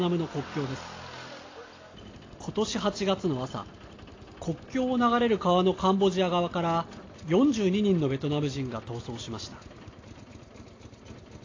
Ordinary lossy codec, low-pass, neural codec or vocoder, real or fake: AAC, 32 kbps; 7.2 kHz; none; real